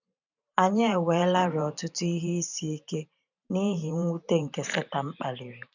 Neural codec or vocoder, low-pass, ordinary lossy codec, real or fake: vocoder, 44.1 kHz, 128 mel bands every 512 samples, BigVGAN v2; 7.2 kHz; none; fake